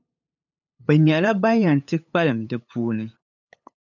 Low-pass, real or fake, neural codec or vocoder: 7.2 kHz; fake; codec, 16 kHz, 8 kbps, FunCodec, trained on LibriTTS, 25 frames a second